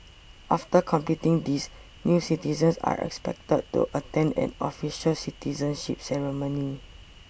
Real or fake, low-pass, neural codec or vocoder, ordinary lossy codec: real; none; none; none